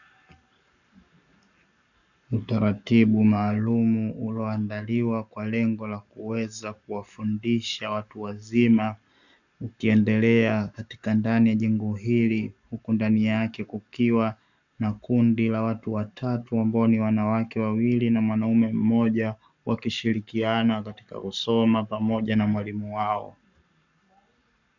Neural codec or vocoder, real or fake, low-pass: codec, 44.1 kHz, 7.8 kbps, Pupu-Codec; fake; 7.2 kHz